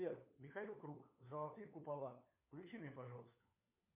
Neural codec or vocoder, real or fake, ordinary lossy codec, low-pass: codec, 16 kHz, 4 kbps, FunCodec, trained on LibriTTS, 50 frames a second; fake; MP3, 32 kbps; 3.6 kHz